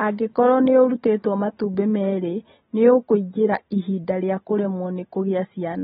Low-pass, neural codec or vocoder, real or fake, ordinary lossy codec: 19.8 kHz; none; real; AAC, 16 kbps